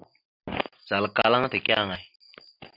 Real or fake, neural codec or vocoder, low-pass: real; none; 5.4 kHz